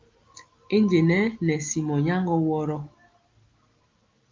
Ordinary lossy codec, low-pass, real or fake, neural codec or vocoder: Opus, 32 kbps; 7.2 kHz; real; none